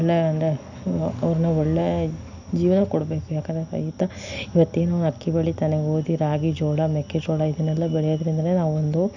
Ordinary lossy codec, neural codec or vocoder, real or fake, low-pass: none; none; real; 7.2 kHz